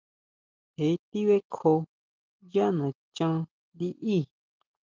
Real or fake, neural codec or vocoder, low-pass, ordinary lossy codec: real; none; 7.2 kHz; Opus, 32 kbps